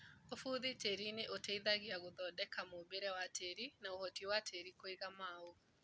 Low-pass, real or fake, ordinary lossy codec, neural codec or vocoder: none; real; none; none